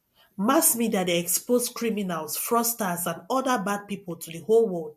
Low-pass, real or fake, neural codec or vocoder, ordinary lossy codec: 14.4 kHz; real; none; MP3, 64 kbps